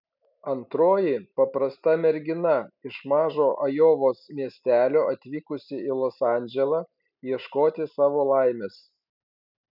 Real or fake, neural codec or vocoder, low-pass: real; none; 5.4 kHz